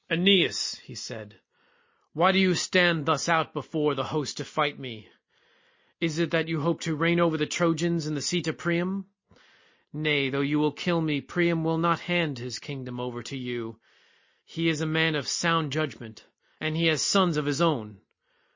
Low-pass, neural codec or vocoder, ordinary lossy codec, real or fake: 7.2 kHz; none; MP3, 32 kbps; real